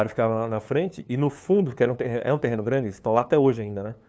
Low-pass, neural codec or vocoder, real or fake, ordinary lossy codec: none; codec, 16 kHz, 2 kbps, FunCodec, trained on LibriTTS, 25 frames a second; fake; none